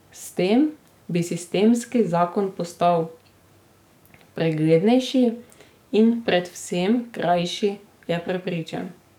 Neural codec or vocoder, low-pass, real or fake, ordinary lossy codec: codec, 44.1 kHz, 7.8 kbps, DAC; 19.8 kHz; fake; none